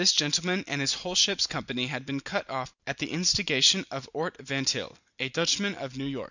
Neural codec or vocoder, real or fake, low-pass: none; real; 7.2 kHz